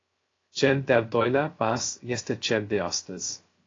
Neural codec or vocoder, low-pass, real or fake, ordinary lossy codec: codec, 16 kHz, 0.3 kbps, FocalCodec; 7.2 kHz; fake; AAC, 32 kbps